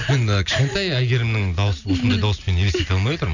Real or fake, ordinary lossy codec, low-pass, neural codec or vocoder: real; none; 7.2 kHz; none